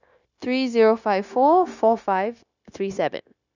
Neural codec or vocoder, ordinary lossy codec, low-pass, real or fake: codec, 16 kHz, 0.9 kbps, LongCat-Audio-Codec; none; 7.2 kHz; fake